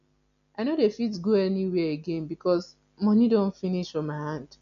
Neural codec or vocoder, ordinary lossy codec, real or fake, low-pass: none; none; real; 7.2 kHz